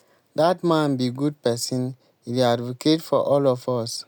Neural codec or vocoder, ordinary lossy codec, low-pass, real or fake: none; none; none; real